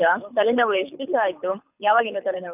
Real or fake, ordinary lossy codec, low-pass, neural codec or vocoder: fake; none; 3.6 kHz; codec, 24 kHz, 6 kbps, HILCodec